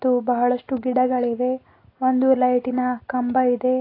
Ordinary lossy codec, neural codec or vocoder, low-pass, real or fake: AAC, 24 kbps; none; 5.4 kHz; real